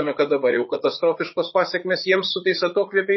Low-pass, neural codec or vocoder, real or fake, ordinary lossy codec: 7.2 kHz; vocoder, 44.1 kHz, 80 mel bands, Vocos; fake; MP3, 24 kbps